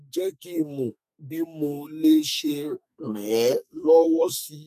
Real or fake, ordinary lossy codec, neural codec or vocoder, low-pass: fake; none; codec, 32 kHz, 1.9 kbps, SNAC; 14.4 kHz